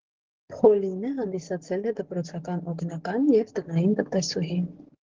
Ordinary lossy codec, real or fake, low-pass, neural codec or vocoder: Opus, 16 kbps; fake; 7.2 kHz; codec, 44.1 kHz, 7.8 kbps, DAC